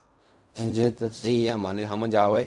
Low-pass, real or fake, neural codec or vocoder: 10.8 kHz; fake; codec, 16 kHz in and 24 kHz out, 0.4 kbps, LongCat-Audio-Codec, fine tuned four codebook decoder